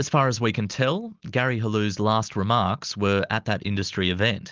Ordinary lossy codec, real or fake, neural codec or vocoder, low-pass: Opus, 32 kbps; real; none; 7.2 kHz